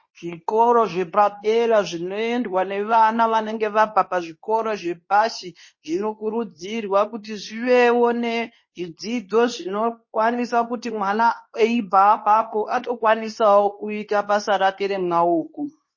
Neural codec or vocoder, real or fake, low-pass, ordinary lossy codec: codec, 24 kHz, 0.9 kbps, WavTokenizer, medium speech release version 2; fake; 7.2 kHz; MP3, 32 kbps